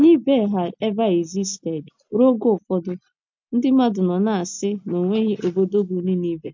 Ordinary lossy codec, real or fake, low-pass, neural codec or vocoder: MP3, 48 kbps; real; 7.2 kHz; none